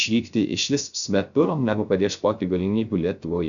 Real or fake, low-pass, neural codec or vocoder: fake; 7.2 kHz; codec, 16 kHz, 0.3 kbps, FocalCodec